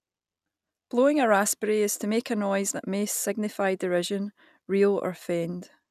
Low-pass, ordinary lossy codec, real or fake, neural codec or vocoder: 14.4 kHz; none; real; none